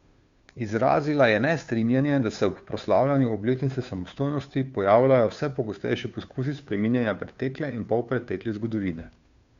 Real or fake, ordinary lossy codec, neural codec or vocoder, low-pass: fake; Opus, 64 kbps; codec, 16 kHz, 2 kbps, FunCodec, trained on Chinese and English, 25 frames a second; 7.2 kHz